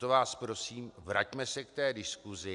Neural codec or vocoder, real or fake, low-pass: none; real; 10.8 kHz